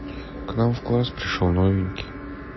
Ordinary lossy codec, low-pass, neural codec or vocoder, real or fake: MP3, 24 kbps; 7.2 kHz; none; real